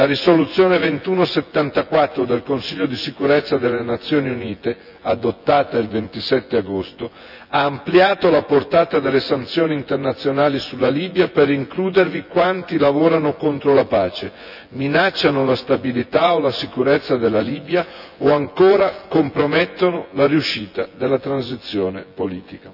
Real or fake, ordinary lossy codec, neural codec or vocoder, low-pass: fake; none; vocoder, 24 kHz, 100 mel bands, Vocos; 5.4 kHz